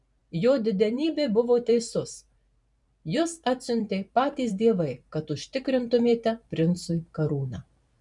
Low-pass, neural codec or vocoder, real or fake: 10.8 kHz; vocoder, 44.1 kHz, 128 mel bands every 512 samples, BigVGAN v2; fake